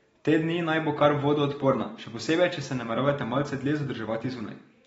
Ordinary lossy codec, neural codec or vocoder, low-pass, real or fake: AAC, 24 kbps; none; 19.8 kHz; real